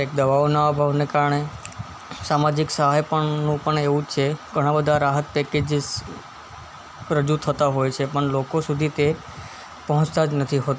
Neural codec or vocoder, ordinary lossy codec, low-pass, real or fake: none; none; none; real